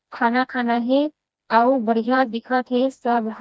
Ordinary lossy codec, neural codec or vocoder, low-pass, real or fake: none; codec, 16 kHz, 1 kbps, FreqCodec, smaller model; none; fake